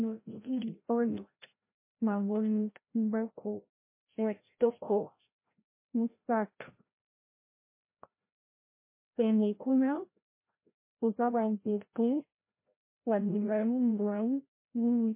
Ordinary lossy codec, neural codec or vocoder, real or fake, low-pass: MP3, 24 kbps; codec, 16 kHz, 0.5 kbps, FreqCodec, larger model; fake; 3.6 kHz